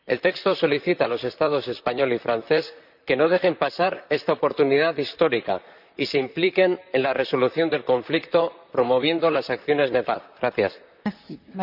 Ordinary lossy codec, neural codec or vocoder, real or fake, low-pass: none; vocoder, 44.1 kHz, 128 mel bands, Pupu-Vocoder; fake; 5.4 kHz